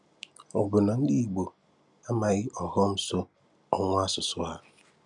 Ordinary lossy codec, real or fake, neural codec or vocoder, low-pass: none; real; none; 10.8 kHz